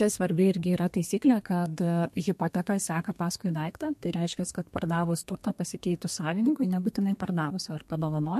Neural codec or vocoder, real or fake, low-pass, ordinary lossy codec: codec, 32 kHz, 1.9 kbps, SNAC; fake; 14.4 kHz; MP3, 64 kbps